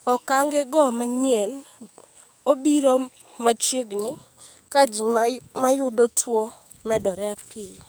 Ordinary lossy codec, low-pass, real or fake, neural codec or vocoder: none; none; fake; codec, 44.1 kHz, 2.6 kbps, SNAC